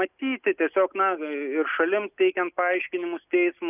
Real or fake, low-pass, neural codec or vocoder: real; 3.6 kHz; none